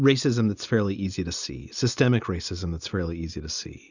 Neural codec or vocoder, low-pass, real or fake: none; 7.2 kHz; real